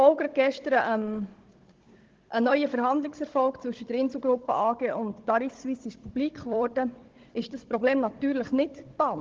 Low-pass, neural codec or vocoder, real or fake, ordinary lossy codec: 7.2 kHz; codec, 16 kHz, 16 kbps, FunCodec, trained on Chinese and English, 50 frames a second; fake; Opus, 16 kbps